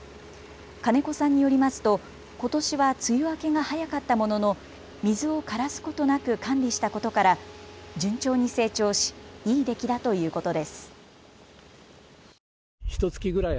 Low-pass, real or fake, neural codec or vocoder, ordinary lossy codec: none; real; none; none